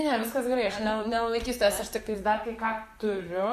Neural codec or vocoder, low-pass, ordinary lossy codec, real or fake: vocoder, 44.1 kHz, 128 mel bands, Pupu-Vocoder; 14.4 kHz; AAC, 96 kbps; fake